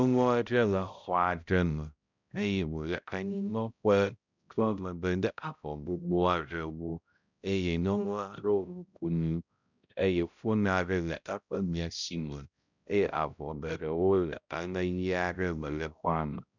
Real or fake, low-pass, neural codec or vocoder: fake; 7.2 kHz; codec, 16 kHz, 0.5 kbps, X-Codec, HuBERT features, trained on balanced general audio